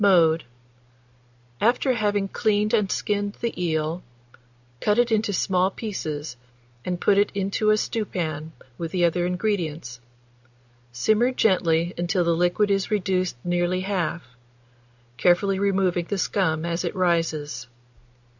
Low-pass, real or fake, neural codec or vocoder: 7.2 kHz; real; none